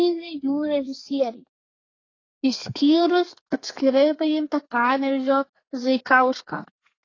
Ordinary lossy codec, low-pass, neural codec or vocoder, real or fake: AAC, 32 kbps; 7.2 kHz; codec, 32 kHz, 1.9 kbps, SNAC; fake